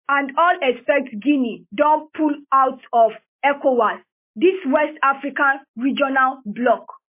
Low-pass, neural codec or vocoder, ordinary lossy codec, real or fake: 3.6 kHz; none; MP3, 24 kbps; real